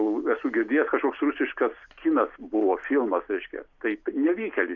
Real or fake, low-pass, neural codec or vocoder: real; 7.2 kHz; none